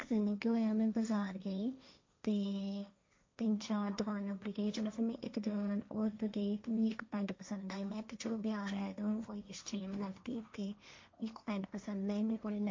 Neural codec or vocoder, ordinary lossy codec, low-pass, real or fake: codec, 16 kHz, 1.1 kbps, Voila-Tokenizer; MP3, 48 kbps; 7.2 kHz; fake